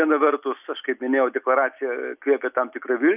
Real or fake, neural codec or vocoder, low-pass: real; none; 3.6 kHz